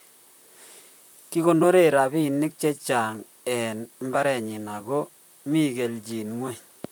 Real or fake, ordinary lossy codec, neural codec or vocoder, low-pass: fake; none; vocoder, 44.1 kHz, 128 mel bands, Pupu-Vocoder; none